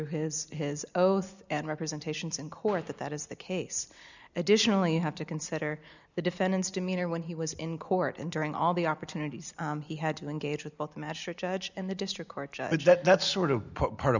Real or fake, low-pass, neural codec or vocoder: real; 7.2 kHz; none